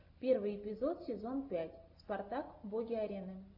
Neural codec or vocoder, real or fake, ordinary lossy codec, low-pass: none; real; AAC, 48 kbps; 5.4 kHz